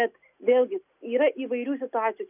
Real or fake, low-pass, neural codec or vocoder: real; 3.6 kHz; none